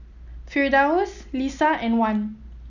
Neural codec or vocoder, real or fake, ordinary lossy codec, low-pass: none; real; none; 7.2 kHz